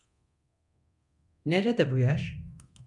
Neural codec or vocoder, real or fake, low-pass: codec, 24 kHz, 0.9 kbps, DualCodec; fake; 10.8 kHz